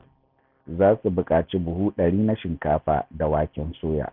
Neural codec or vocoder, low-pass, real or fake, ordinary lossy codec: none; 5.4 kHz; real; none